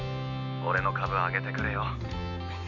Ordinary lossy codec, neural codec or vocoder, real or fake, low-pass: none; none; real; 7.2 kHz